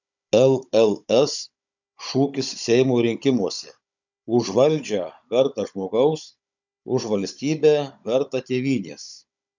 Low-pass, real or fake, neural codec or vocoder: 7.2 kHz; fake; codec, 16 kHz, 16 kbps, FunCodec, trained on Chinese and English, 50 frames a second